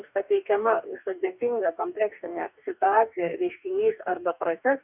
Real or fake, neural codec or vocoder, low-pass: fake; codec, 44.1 kHz, 2.6 kbps, DAC; 3.6 kHz